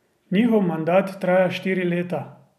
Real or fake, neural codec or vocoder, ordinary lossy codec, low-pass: real; none; none; 14.4 kHz